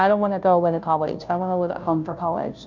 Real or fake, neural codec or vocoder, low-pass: fake; codec, 16 kHz, 0.5 kbps, FunCodec, trained on Chinese and English, 25 frames a second; 7.2 kHz